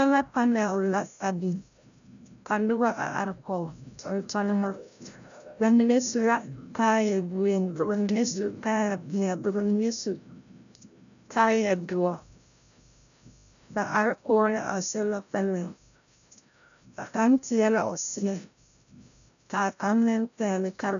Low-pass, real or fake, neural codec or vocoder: 7.2 kHz; fake; codec, 16 kHz, 0.5 kbps, FreqCodec, larger model